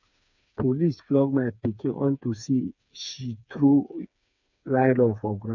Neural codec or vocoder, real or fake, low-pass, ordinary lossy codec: codec, 16 kHz, 4 kbps, FreqCodec, smaller model; fake; 7.2 kHz; none